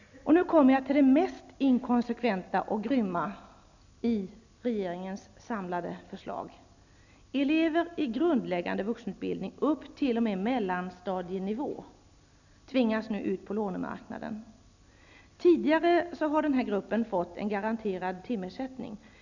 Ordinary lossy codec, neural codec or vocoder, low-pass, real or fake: none; none; 7.2 kHz; real